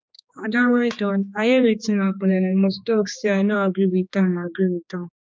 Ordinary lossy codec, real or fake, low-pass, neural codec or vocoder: none; fake; none; codec, 16 kHz, 2 kbps, X-Codec, HuBERT features, trained on general audio